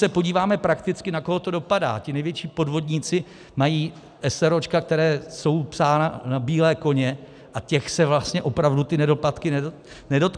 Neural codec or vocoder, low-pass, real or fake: none; 9.9 kHz; real